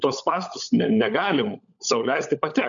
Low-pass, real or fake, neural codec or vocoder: 7.2 kHz; fake; codec, 16 kHz, 16 kbps, FunCodec, trained on LibriTTS, 50 frames a second